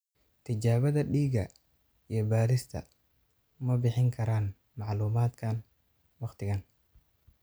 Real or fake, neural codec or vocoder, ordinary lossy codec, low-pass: real; none; none; none